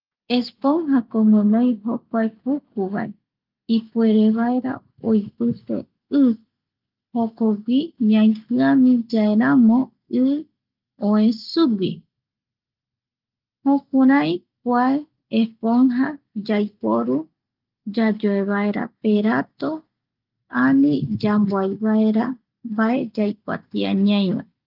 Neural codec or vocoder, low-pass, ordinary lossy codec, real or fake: none; 5.4 kHz; Opus, 24 kbps; real